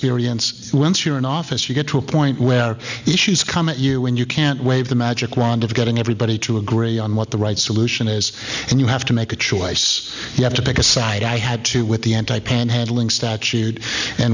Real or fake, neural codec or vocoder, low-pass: real; none; 7.2 kHz